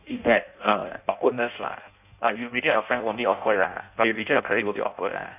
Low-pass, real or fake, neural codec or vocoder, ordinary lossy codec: 3.6 kHz; fake; codec, 16 kHz in and 24 kHz out, 0.6 kbps, FireRedTTS-2 codec; none